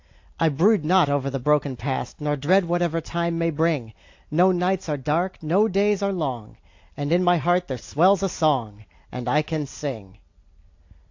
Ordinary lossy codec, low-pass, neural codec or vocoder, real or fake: AAC, 48 kbps; 7.2 kHz; none; real